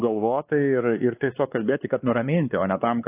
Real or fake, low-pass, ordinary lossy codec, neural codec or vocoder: fake; 3.6 kHz; AAC, 24 kbps; codec, 16 kHz, 2 kbps, X-Codec, WavLM features, trained on Multilingual LibriSpeech